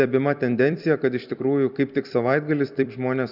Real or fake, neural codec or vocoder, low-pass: fake; vocoder, 44.1 kHz, 128 mel bands every 256 samples, BigVGAN v2; 5.4 kHz